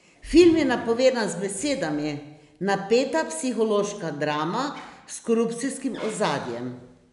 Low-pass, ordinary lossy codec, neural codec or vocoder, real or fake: 10.8 kHz; none; none; real